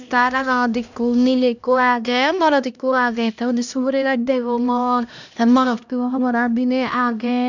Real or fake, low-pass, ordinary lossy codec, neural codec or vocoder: fake; 7.2 kHz; none; codec, 16 kHz, 1 kbps, X-Codec, HuBERT features, trained on LibriSpeech